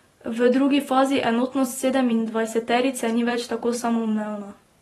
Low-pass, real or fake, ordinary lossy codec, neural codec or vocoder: 19.8 kHz; fake; AAC, 32 kbps; vocoder, 44.1 kHz, 128 mel bands every 512 samples, BigVGAN v2